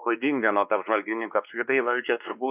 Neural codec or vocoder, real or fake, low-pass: codec, 16 kHz, 2 kbps, X-Codec, WavLM features, trained on Multilingual LibriSpeech; fake; 3.6 kHz